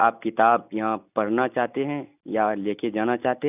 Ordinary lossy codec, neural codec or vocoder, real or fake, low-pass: none; none; real; 3.6 kHz